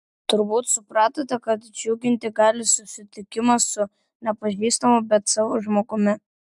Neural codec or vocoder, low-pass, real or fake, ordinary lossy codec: none; 10.8 kHz; real; MP3, 96 kbps